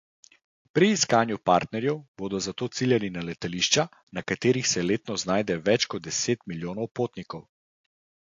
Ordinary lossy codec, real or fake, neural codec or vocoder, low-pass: AAC, 48 kbps; real; none; 7.2 kHz